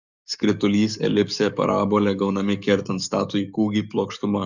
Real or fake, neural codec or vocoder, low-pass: fake; codec, 44.1 kHz, 7.8 kbps, DAC; 7.2 kHz